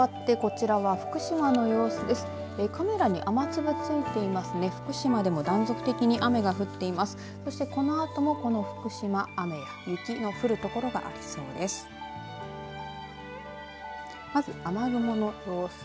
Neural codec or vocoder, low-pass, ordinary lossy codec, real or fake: none; none; none; real